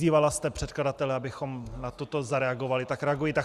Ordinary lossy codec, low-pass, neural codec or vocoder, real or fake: Opus, 64 kbps; 14.4 kHz; none; real